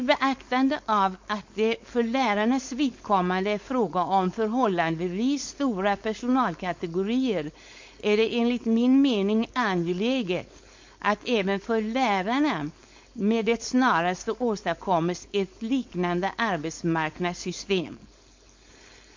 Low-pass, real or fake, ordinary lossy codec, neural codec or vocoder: 7.2 kHz; fake; MP3, 48 kbps; codec, 16 kHz, 4.8 kbps, FACodec